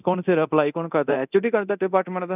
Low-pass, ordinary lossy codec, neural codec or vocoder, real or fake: 3.6 kHz; none; codec, 24 kHz, 0.9 kbps, DualCodec; fake